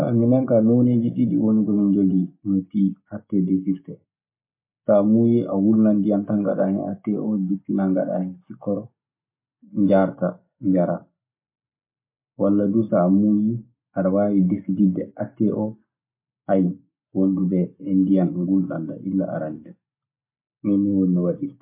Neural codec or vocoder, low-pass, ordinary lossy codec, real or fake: none; 3.6 kHz; MP3, 24 kbps; real